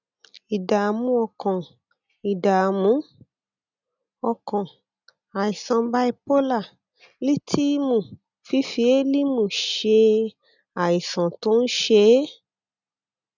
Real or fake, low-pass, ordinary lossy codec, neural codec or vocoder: real; 7.2 kHz; none; none